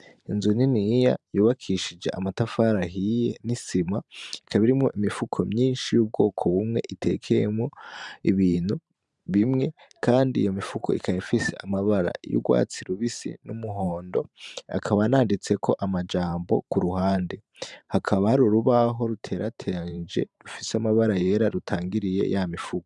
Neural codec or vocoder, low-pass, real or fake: vocoder, 44.1 kHz, 128 mel bands every 512 samples, BigVGAN v2; 10.8 kHz; fake